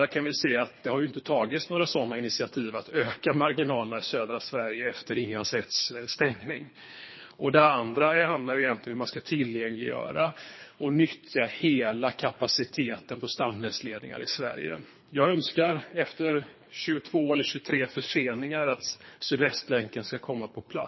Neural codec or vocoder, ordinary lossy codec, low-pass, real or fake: codec, 24 kHz, 3 kbps, HILCodec; MP3, 24 kbps; 7.2 kHz; fake